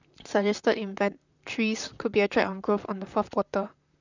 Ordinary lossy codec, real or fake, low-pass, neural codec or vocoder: none; fake; 7.2 kHz; vocoder, 44.1 kHz, 128 mel bands, Pupu-Vocoder